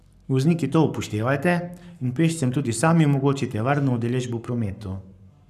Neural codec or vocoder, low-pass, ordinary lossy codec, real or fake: codec, 44.1 kHz, 7.8 kbps, Pupu-Codec; 14.4 kHz; none; fake